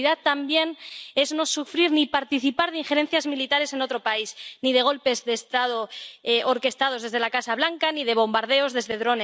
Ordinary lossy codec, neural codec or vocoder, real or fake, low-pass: none; none; real; none